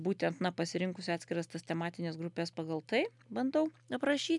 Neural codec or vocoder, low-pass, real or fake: none; 10.8 kHz; real